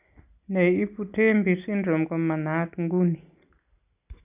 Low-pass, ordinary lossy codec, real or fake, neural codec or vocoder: 3.6 kHz; none; real; none